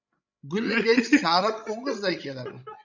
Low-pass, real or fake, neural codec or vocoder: 7.2 kHz; fake; codec, 16 kHz, 16 kbps, FreqCodec, larger model